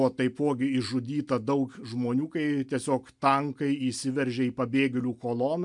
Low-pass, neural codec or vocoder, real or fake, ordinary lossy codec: 10.8 kHz; none; real; MP3, 96 kbps